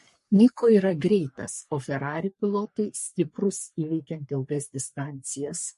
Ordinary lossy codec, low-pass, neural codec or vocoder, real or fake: MP3, 48 kbps; 14.4 kHz; codec, 44.1 kHz, 3.4 kbps, Pupu-Codec; fake